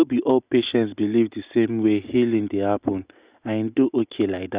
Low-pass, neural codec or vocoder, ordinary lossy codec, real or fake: 3.6 kHz; none; Opus, 64 kbps; real